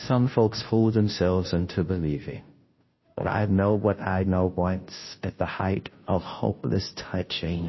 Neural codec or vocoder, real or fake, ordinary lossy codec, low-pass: codec, 16 kHz, 0.5 kbps, FunCodec, trained on Chinese and English, 25 frames a second; fake; MP3, 24 kbps; 7.2 kHz